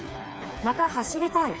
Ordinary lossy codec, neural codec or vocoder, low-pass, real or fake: none; codec, 16 kHz, 4 kbps, FreqCodec, smaller model; none; fake